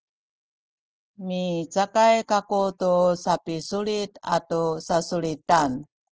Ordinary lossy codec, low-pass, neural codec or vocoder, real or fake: Opus, 16 kbps; 7.2 kHz; none; real